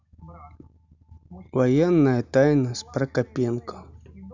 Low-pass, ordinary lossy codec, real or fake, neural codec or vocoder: 7.2 kHz; none; real; none